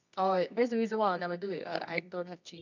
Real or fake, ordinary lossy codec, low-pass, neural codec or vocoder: fake; none; 7.2 kHz; codec, 24 kHz, 0.9 kbps, WavTokenizer, medium music audio release